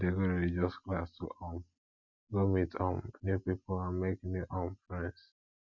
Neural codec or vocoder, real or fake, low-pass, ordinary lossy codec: none; real; 7.2 kHz; Opus, 64 kbps